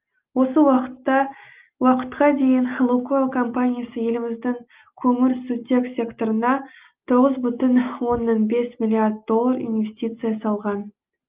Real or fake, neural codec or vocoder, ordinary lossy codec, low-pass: real; none; Opus, 24 kbps; 3.6 kHz